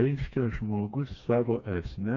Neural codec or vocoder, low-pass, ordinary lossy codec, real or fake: codec, 16 kHz, 2 kbps, FreqCodec, smaller model; 7.2 kHz; MP3, 64 kbps; fake